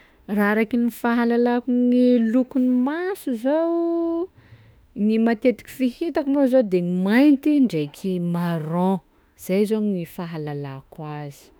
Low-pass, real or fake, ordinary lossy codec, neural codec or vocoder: none; fake; none; autoencoder, 48 kHz, 32 numbers a frame, DAC-VAE, trained on Japanese speech